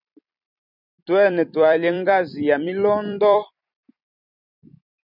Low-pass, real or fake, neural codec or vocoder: 5.4 kHz; fake; vocoder, 44.1 kHz, 128 mel bands every 256 samples, BigVGAN v2